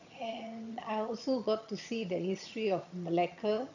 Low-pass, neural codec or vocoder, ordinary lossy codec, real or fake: 7.2 kHz; vocoder, 22.05 kHz, 80 mel bands, HiFi-GAN; none; fake